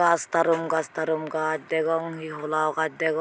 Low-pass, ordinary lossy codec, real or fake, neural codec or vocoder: none; none; real; none